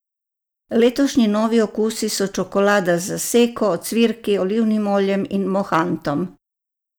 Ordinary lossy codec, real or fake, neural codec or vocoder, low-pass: none; real; none; none